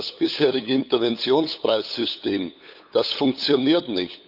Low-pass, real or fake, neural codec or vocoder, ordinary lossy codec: 5.4 kHz; fake; codec, 16 kHz, 8 kbps, FunCodec, trained on LibriTTS, 25 frames a second; none